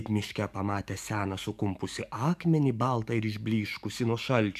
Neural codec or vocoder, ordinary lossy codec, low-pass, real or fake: codec, 44.1 kHz, 7.8 kbps, DAC; MP3, 96 kbps; 14.4 kHz; fake